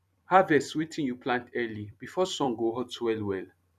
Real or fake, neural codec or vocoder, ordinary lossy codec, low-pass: fake; vocoder, 44.1 kHz, 128 mel bands every 256 samples, BigVGAN v2; none; 14.4 kHz